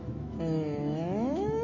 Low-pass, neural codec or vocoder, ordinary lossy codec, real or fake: 7.2 kHz; autoencoder, 48 kHz, 128 numbers a frame, DAC-VAE, trained on Japanese speech; none; fake